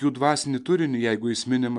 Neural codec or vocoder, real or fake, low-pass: none; real; 10.8 kHz